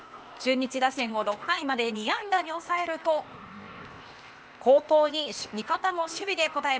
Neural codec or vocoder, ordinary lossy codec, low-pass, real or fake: codec, 16 kHz, 0.8 kbps, ZipCodec; none; none; fake